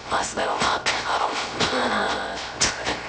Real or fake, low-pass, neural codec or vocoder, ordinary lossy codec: fake; none; codec, 16 kHz, 0.3 kbps, FocalCodec; none